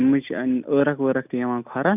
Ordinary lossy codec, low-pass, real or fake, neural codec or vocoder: none; 3.6 kHz; real; none